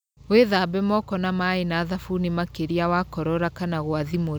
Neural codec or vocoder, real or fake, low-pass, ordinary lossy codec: none; real; none; none